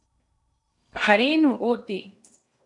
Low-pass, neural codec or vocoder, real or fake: 10.8 kHz; codec, 16 kHz in and 24 kHz out, 0.8 kbps, FocalCodec, streaming, 65536 codes; fake